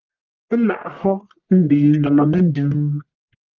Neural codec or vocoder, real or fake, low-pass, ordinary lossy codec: codec, 44.1 kHz, 1.7 kbps, Pupu-Codec; fake; 7.2 kHz; Opus, 32 kbps